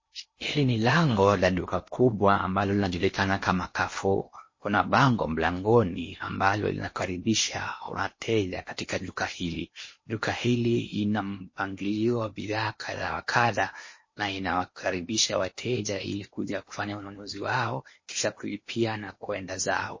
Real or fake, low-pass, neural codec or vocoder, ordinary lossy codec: fake; 7.2 kHz; codec, 16 kHz in and 24 kHz out, 0.8 kbps, FocalCodec, streaming, 65536 codes; MP3, 32 kbps